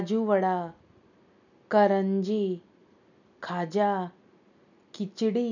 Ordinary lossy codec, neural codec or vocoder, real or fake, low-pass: none; none; real; 7.2 kHz